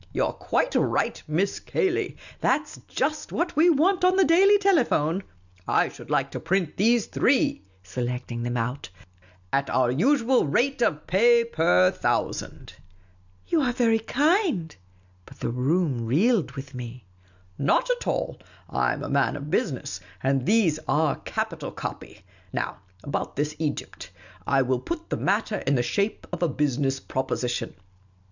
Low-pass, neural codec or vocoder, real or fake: 7.2 kHz; none; real